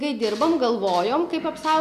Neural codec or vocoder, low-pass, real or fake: none; 14.4 kHz; real